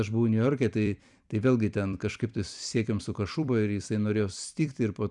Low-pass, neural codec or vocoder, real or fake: 10.8 kHz; vocoder, 44.1 kHz, 128 mel bands every 256 samples, BigVGAN v2; fake